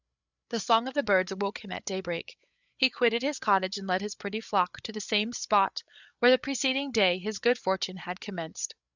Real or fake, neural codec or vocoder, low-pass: fake; codec, 16 kHz, 8 kbps, FreqCodec, larger model; 7.2 kHz